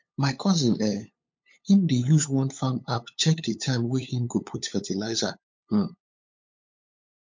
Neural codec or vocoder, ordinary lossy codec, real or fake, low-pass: codec, 16 kHz, 8 kbps, FunCodec, trained on LibriTTS, 25 frames a second; MP3, 48 kbps; fake; 7.2 kHz